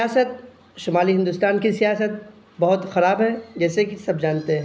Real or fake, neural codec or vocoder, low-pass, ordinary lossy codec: real; none; none; none